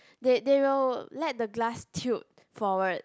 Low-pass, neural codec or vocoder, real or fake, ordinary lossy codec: none; none; real; none